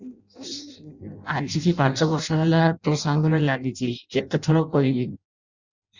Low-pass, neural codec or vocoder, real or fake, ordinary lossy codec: 7.2 kHz; codec, 16 kHz in and 24 kHz out, 0.6 kbps, FireRedTTS-2 codec; fake; Opus, 64 kbps